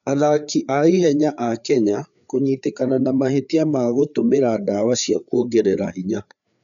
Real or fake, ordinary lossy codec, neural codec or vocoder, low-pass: fake; none; codec, 16 kHz, 4 kbps, FreqCodec, larger model; 7.2 kHz